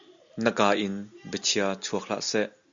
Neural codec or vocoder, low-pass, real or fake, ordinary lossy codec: none; 7.2 kHz; real; Opus, 64 kbps